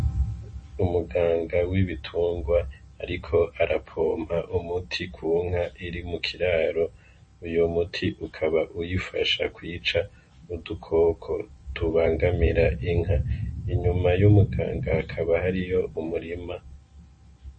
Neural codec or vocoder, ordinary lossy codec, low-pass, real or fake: none; MP3, 32 kbps; 9.9 kHz; real